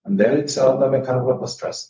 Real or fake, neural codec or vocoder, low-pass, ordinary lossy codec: fake; codec, 16 kHz, 0.4 kbps, LongCat-Audio-Codec; none; none